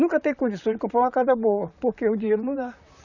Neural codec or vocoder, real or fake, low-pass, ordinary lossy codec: vocoder, 44.1 kHz, 128 mel bands, Pupu-Vocoder; fake; 7.2 kHz; none